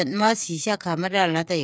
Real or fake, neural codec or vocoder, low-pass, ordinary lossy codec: fake; codec, 16 kHz, 8 kbps, FreqCodec, smaller model; none; none